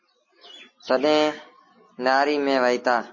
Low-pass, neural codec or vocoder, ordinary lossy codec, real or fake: 7.2 kHz; none; MP3, 32 kbps; real